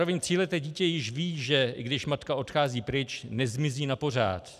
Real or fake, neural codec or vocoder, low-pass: real; none; 14.4 kHz